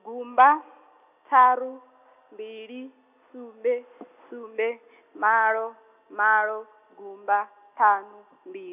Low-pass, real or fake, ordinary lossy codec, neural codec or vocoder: 3.6 kHz; real; none; none